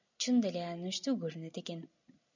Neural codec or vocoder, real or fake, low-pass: none; real; 7.2 kHz